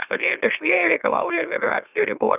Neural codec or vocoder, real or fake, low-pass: autoencoder, 44.1 kHz, a latent of 192 numbers a frame, MeloTTS; fake; 3.6 kHz